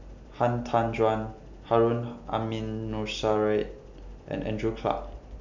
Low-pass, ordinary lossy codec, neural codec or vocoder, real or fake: 7.2 kHz; MP3, 64 kbps; none; real